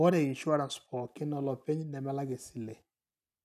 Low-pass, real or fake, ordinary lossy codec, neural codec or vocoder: 14.4 kHz; real; none; none